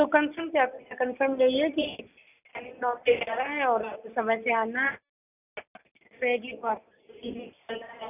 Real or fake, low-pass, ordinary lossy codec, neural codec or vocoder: real; 3.6 kHz; none; none